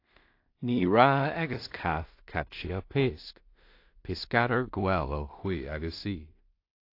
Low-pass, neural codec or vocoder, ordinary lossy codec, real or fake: 5.4 kHz; codec, 16 kHz in and 24 kHz out, 0.4 kbps, LongCat-Audio-Codec, two codebook decoder; AAC, 32 kbps; fake